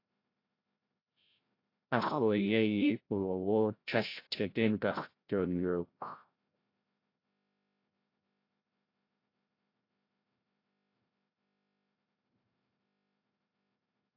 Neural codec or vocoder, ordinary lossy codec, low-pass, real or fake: codec, 16 kHz, 0.5 kbps, FreqCodec, larger model; AAC, 32 kbps; 5.4 kHz; fake